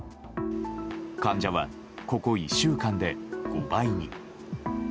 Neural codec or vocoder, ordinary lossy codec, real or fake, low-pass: none; none; real; none